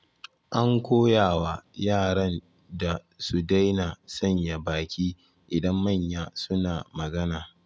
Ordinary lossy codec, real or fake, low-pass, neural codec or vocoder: none; real; none; none